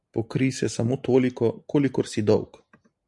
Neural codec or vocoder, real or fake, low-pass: none; real; 10.8 kHz